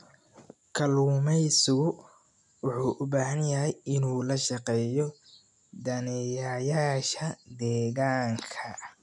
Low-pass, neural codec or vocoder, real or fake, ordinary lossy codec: 10.8 kHz; none; real; none